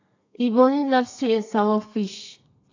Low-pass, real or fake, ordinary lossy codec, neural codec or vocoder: 7.2 kHz; fake; AAC, 48 kbps; codec, 32 kHz, 1.9 kbps, SNAC